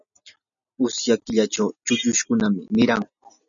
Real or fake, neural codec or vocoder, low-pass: real; none; 7.2 kHz